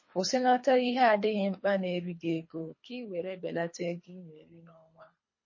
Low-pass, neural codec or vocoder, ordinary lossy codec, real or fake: 7.2 kHz; codec, 24 kHz, 3 kbps, HILCodec; MP3, 32 kbps; fake